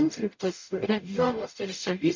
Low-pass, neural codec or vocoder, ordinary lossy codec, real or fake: 7.2 kHz; codec, 44.1 kHz, 0.9 kbps, DAC; MP3, 48 kbps; fake